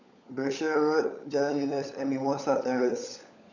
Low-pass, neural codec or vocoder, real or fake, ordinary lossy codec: 7.2 kHz; codec, 16 kHz, 16 kbps, FunCodec, trained on LibriTTS, 50 frames a second; fake; none